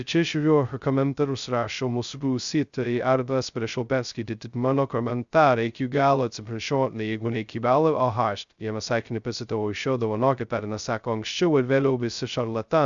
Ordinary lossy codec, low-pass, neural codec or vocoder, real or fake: Opus, 64 kbps; 7.2 kHz; codec, 16 kHz, 0.2 kbps, FocalCodec; fake